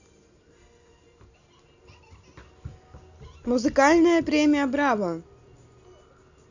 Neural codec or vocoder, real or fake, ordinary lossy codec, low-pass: none; real; none; 7.2 kHz